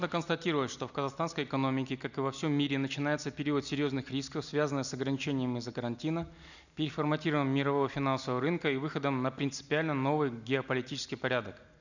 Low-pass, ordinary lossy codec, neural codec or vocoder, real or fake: 7.2 kHz; none; none; real